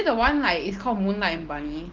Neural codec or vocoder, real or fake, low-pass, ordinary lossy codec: none; real; 7.2 kHz; Opus, 16 kbps